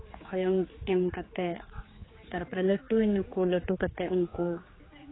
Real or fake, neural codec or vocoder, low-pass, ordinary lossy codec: fake; codec, 16 kHz, 4 kbps, X-Codec, HuBERT features, trained on general audio; 7.2 kHz; AAC, 16 kbps